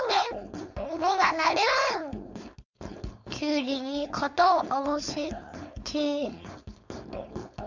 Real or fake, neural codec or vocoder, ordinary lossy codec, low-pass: fake; codec, 16 kHz, 4.8 kbps, FACodec; none; 7.2 kHz